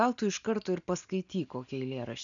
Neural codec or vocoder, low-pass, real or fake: none; 7.2 kHz; real